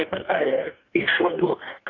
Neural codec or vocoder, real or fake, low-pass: codec, 44.1 kHz, 2.6 kbps, DAC; fake; 7.2 kHz